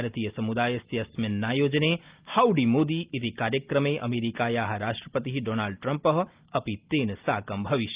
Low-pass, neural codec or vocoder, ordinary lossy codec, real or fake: 3.6 kHz; none; Opus, 32 kbps; real